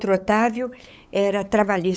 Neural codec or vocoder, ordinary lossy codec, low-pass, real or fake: codec, 16 kHz, 8 kbps, FunCodec, trained on LibriTTS, 25 frames a second; none; none; fake